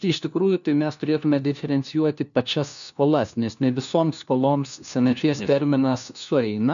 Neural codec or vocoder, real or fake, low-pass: codec, 16 kHz, 1 kbps, FunCodec, trained on LibriTTS, 50 frames a second; fake; 7.2 kHz